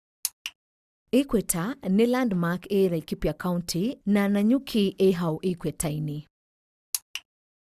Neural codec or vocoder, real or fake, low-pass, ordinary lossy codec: none; real; 14.4 kHz; Opus, 64 kbps